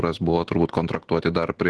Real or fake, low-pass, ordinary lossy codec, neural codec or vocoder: real; 10.8 kHz; Opus, 16 kbps; none